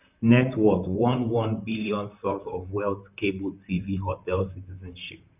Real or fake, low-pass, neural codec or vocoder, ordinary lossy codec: fake; 3.6 kHz; vocoder, 44.1 kHz, 128 mel bands, Pupu-Vocoder; none